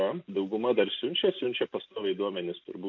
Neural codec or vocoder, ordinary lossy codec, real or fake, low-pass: none; MP3, 48 kbps; real; 7.2 kHz